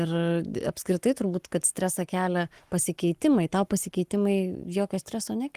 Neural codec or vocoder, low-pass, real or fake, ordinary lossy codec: codec, 44.1 kHz, 7.8 kbps, Pupu-Codec; 14.4 kHz; fake; Opus, 24 kbps